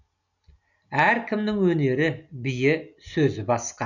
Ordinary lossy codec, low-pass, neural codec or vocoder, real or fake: none; 7.2 kHz; none; real